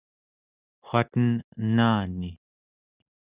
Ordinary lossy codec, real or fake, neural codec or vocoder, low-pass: Opus, 64 kbps; real; none; 3.6 kHz